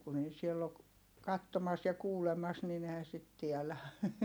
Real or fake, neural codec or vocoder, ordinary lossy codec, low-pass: real; none; none; none